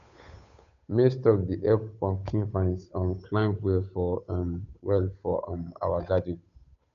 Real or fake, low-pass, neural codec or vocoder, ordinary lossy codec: fake; 7.2 kHz; codec, 16 kHz, 8 kbps, FunCodec, trained on Chinese and English, 25 frames a second; none